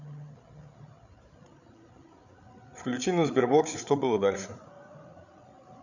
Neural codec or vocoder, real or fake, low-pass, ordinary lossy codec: codec, 16 kHz, 8 kbps, FreqCodec, larger model; fake; 7.2 kHz; none